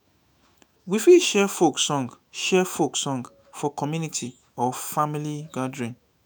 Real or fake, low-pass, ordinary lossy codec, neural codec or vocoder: fake; none; none; autoencoder, 48 kHz, 128 numbers a frame, DAC-VAE, trained on Japanese speech